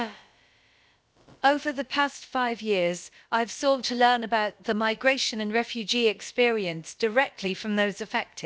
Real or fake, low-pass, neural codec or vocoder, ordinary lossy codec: fake; none; codec, 16 kHz, about 1 kbps, DyCAST, with the encoder's durations; none